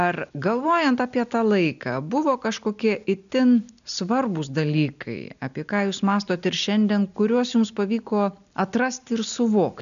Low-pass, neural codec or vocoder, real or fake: 7.2 kHz; none; real